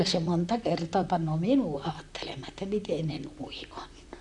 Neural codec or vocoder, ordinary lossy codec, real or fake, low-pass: vocoder, 44.1 kHz, 128 mel bands, Pupu-Vocoder; none; fake; 10.8 kHz